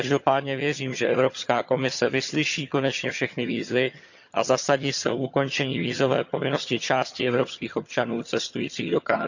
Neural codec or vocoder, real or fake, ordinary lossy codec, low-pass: vocoder, 22.05 kHz, 80 mel bands, HiFi-GAN; fake; none; 7.2 kHz